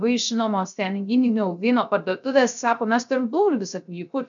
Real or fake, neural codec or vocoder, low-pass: fake; codec, 16 kHz, 0.3 kbps, FocalCodec; 7.2 kHz